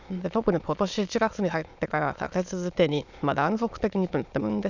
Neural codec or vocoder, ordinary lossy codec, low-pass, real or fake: autoencoder, 22.05 kHz, a latent of 192 numbers a frame, VITS, trained on many speakers; none; 7.2 kHz; fake